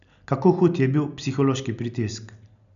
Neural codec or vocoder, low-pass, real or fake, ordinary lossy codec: none; 7.2 kHz; real; none